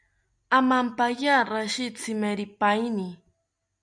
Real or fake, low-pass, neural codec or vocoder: real; 9.9 kHz; none